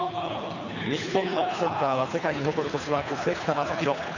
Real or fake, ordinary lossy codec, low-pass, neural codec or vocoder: fake; none; 7.2 kHz; codec, 24 kHz, 3 kbps, HILCodec